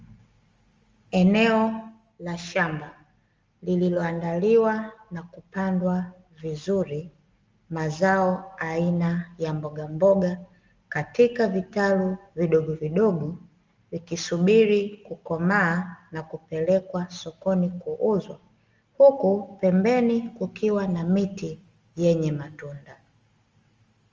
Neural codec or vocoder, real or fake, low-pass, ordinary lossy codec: none; real; 7.2 kHz; Opus, 32 kbps